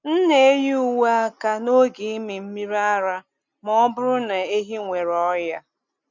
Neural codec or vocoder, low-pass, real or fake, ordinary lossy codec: none; 7.2 kHz; real; none